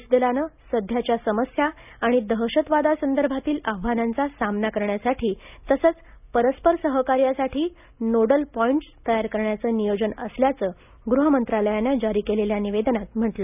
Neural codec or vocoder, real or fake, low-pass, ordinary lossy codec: none; real; 3.6 kHz; none